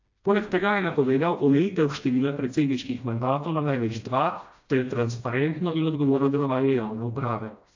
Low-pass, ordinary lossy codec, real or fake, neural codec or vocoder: 7.2 kHz; AAC, 48 kbps; fake; codec, 16 kHz, 1 kbps, FreqCodec, smaller model